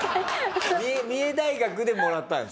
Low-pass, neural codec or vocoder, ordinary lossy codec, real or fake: none; none; none; real